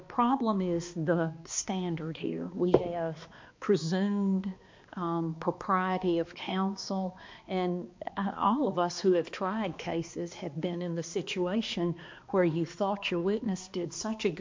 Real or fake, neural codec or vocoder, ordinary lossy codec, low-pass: fake; codec, 16 kHz, 2 kbps, X-Codec, HuBERT features, trained on balanced general audio; MP3, 48 kbps; 7.2 kHz